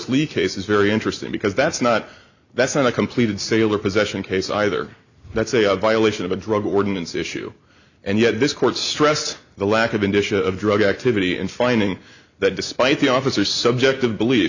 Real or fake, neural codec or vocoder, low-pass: real; none; 7.2 kHz